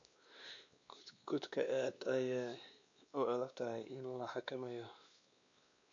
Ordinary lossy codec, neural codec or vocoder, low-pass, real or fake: AAC, 64 kbps; codec, 16 kHz, 2 kbps, X-Codec, WavLM features, trained on Multilingual LibriSpeech; 7.2 kHz; fake